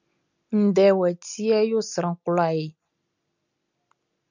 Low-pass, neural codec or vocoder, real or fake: 7.2 kHz; none; real